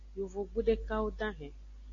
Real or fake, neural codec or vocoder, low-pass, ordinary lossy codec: real; none; 7.2 kHz; AAC, 64 kbps